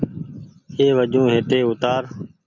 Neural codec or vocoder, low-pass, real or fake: none; 7.2 kHz; real